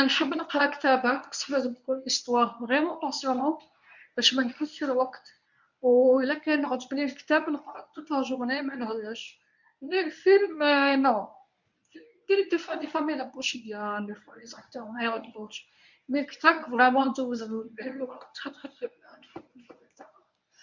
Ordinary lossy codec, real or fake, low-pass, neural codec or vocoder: none; fake; 7.2 kHz; codec, 24 kHz, 0.9 kbps, WavTokenizer, medium speech release version 1